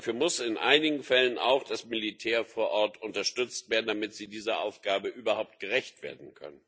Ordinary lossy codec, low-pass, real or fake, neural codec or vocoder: none; none; real; none